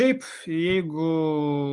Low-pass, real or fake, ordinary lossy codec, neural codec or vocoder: 10.8 kHz; real; Opus, 32 kbps; none